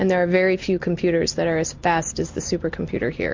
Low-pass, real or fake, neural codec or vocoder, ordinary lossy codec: 7.2 kHz; real; none; MP3, 48 kbps